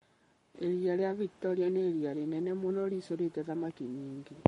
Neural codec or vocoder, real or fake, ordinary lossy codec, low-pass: codec, 44.1 kHz, 7.8 kbps, Pupu-Codec; fake; MP3, 48 kbps; 19.8 kHz